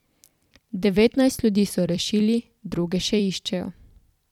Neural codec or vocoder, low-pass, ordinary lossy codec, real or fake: vocoder, 44.1 kHz, 128 mel bands every 256 samples, BigVGAN v2; 19.8 kHz; none; fake